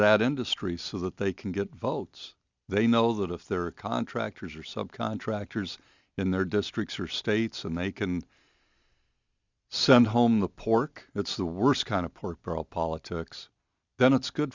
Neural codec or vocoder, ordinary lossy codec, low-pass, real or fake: none; Opus, 64 kbps; 7.2 kHz; real